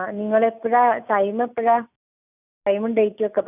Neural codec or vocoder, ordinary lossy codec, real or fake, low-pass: none; none; real; 3.6 kHz